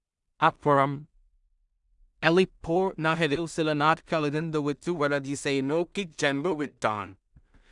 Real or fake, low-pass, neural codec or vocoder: fake; 10.8 kHz; codec, 16 kHz in and 24 kHz out, 0.4 kbps, LongCat-Audio-Codec, two codebook decoder